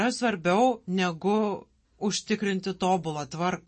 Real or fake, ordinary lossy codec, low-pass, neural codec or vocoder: real; MP3, 32 kbps; 10.8 kHz; none